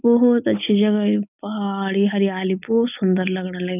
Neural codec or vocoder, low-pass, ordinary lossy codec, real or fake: none; 3.6 kHz; none; real